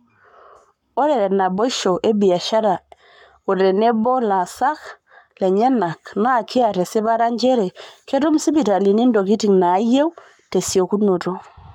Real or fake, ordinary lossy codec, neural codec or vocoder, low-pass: fake; MP3, 96 kbps; codec, 44.1 kHz, 7.8 kbps, Pupu-Codec; 19.8 kHz